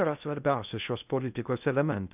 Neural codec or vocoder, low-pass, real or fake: codec, 16 kHz in and 24 kHz out, 0.6 kbps, FocalCodec, streaming, 2048 codes; 3.6 kHz; fake